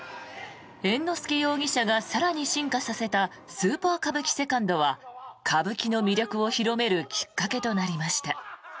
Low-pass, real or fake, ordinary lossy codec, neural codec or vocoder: none; real; none; none